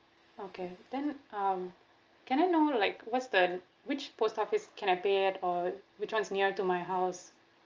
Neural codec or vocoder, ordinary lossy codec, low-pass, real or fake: none; Opus, 24 kbps; 7.2 kHz; real